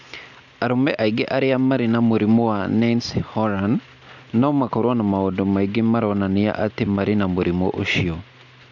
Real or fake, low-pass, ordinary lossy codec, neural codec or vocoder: real; 7.2 kHz; none; none